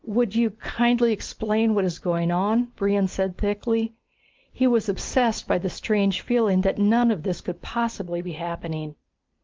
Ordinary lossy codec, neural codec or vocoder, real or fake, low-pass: Opus, 24 kbps; none; real; 7.2 kHz